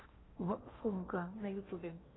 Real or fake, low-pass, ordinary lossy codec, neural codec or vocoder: fake; 7.2 kHz; AAC, 16 kbps; codec, 16 kHz in and 24 kHz out, 0.8 kbps, FocalCodec, streaming, 65536 codes